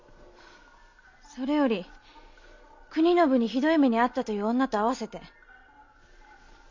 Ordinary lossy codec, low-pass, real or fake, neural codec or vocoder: MP3, 64 kbps; 7.2 kHz; real; none